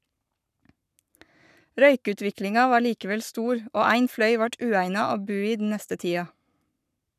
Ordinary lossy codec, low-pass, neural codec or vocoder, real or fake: none; 14.4 kHz; codec, 44.1 kHz, 7.8 kbps, Pupu-Codec; fake